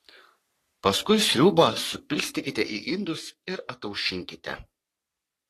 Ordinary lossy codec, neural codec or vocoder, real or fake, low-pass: AAC, 48 kbps; codec, 44.1 kHz, 3.4 kbps, Pupu-Codec; fake; 14.4 kHz